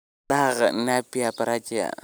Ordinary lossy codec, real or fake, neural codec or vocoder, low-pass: none; real; none; none